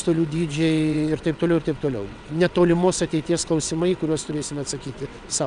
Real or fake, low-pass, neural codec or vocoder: fake; 9.9 kHz; vocoder, 22.05 kHz, 80 mel bands, WaveNeXt